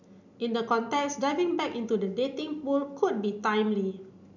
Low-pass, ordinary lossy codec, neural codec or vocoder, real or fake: 7.2 kHz; none; none; real